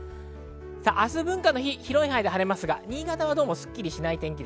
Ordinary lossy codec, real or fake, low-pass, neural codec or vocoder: none; real; none; none